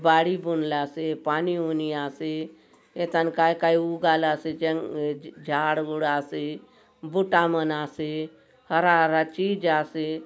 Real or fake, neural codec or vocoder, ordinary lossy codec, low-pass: real; none; none; none